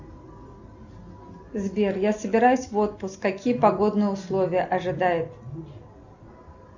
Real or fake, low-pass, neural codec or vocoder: real; 7.2 kHz; none